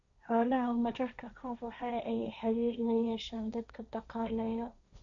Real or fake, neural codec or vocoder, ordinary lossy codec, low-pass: fake; codec, 16 kHz, 1.1 kbps, Voila-Tokenizer; none; 7.2 kHz